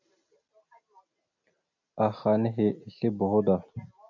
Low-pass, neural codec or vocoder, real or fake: 7.2 kHz; none; real